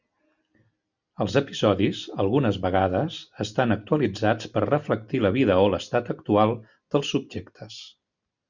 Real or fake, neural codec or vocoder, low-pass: real; none; 7.2 kHz